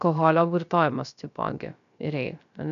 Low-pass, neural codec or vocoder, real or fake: 7.2 kHz; codec, 16 kHz, 0.3 kbps, FocalCodec; fake